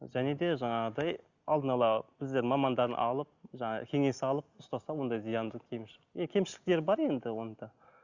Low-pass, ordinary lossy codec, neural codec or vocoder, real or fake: 7.2 kHz; Opus, 64 kbps; none; real